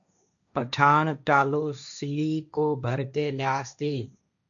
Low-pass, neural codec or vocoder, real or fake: 7.2 kHz; codec, 16 kHz, 1.1 kbps, Voila-Tokenizer; fake